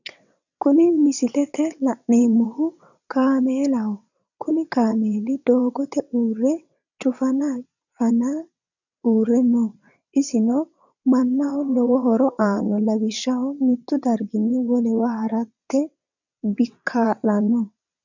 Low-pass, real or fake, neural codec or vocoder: 7.2 kHz; fake; vocoder, 22.05 kHz, 80 mel bands, WaveNeXt